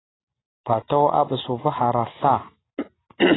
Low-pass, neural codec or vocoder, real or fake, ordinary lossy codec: 7.2 kHz; none; real; AAC, 16 kbps